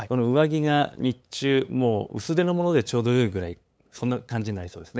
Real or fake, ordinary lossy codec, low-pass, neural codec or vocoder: fake; none; none; codec, 16 kHz, 8 kbps, FunCodec, trained on LibriTTS, 25 frames a second